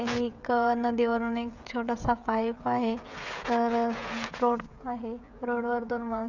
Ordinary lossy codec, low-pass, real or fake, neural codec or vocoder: none; 7.2 kHz; fake; codec, 16 kHz, 16 kbps, FreqCodec, smaller model